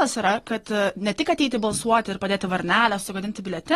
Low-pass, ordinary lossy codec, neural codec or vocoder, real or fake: 19.8 kHz; AAC, 32 kbps; none; real